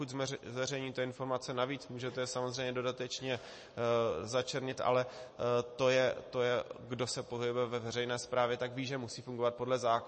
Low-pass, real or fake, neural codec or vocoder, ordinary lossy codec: 10.8 kHz; real; none; MP3, 32 kbps